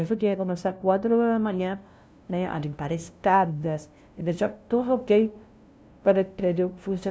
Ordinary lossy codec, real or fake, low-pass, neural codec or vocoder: none; fake; none; codec, 16 kHz, 0.5 kbps, FunCodec, trained on LibriTTS, 25 frames a second